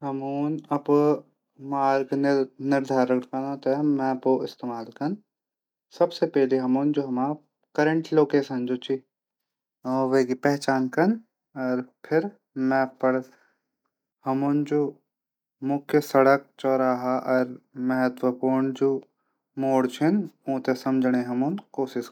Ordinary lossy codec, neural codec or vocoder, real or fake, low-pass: none; none; real; 19.8 kHz